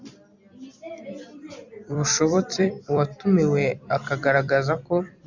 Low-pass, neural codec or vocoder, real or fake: 7.2 kHz; vocoder, 44.1 kHz, 128 mel bands every 256 samples, BigVGAN v2; fake